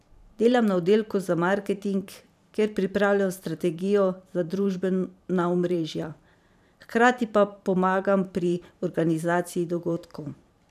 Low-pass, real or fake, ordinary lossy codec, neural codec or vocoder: 14.4 kHz; fake; none; vocoder, 44.1 kHz, 128 mel bands every 512 samples, BigVGAN v2